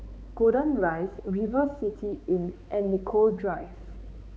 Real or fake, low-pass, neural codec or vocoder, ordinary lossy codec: fake; none; codec, 16 kHz, 4 kbps, X-Codec, HuBERT features, trained on balanced general audio; none